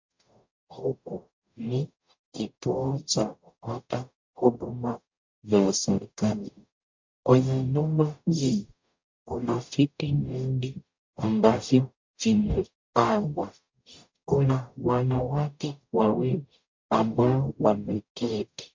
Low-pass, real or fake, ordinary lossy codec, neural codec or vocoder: 7.2 kHz; fake; MP3, 48 kbps; codec, 44.1 kHz, 0.9 kbps, DAC